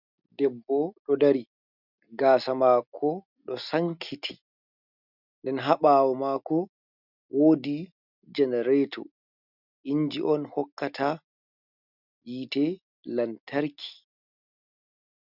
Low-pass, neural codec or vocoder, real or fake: 5.4 kHz; none; real